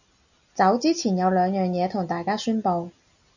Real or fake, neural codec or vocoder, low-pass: real; none; 7.2 kHz